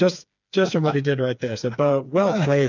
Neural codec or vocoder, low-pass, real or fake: codec, 16 kHz, 4 kbps, FreqCodec, smaller model; 7.2 kHz; fake